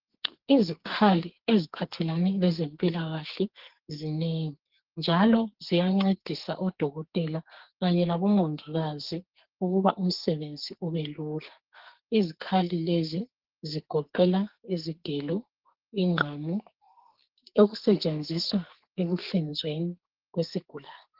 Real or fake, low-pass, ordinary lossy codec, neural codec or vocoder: fake; 5.4 kHz; Opus, 16 kbps; codec, 44.1 kHz, 2.6 kbps, SNAC